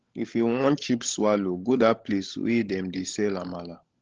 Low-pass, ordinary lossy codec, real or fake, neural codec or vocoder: 7.2 kHz; Opus, 16 kbps; fake; codec, 16 kHz, 16 kbps, FunCodec, trained on LibriTTS, 50 frames a second